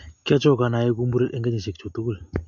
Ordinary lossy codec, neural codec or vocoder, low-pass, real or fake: MP3, 48 kbps; none; 7.2 kHz; real